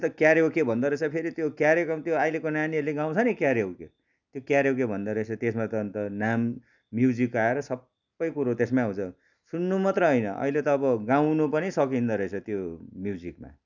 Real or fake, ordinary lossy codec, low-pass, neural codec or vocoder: real; none; 7.2 kHz; none